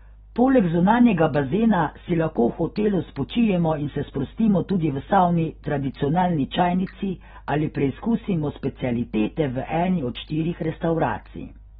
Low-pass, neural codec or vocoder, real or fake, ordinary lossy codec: 10.8 kHz; none; real; AAC, 16 kbps